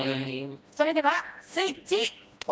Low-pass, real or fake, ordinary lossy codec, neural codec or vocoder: none; fake; none; codec, 16 kHz, 1 kbps, FreqCodec, smaller model